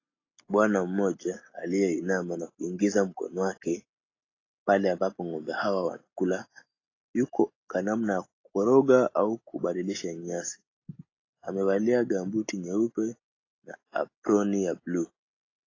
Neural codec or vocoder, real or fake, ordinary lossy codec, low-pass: none; real; AAC, 32 kbps; 7.2 kHz